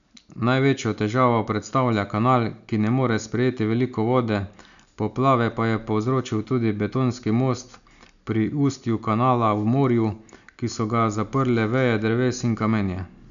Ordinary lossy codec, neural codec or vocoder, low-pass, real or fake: none; none; 7.2 kHz; real